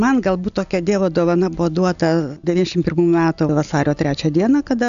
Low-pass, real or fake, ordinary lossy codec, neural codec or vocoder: 7.2 kHz; real; AAC, 96 kbps; none